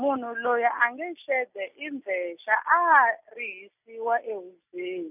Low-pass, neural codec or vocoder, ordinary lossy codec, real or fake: 3.6 kHz; none; none; real